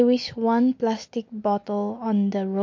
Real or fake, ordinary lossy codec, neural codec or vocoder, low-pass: real; MP3, 48 kbps; none; 7.2 kHz